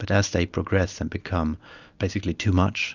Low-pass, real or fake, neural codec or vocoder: 7.2 kHz; real; none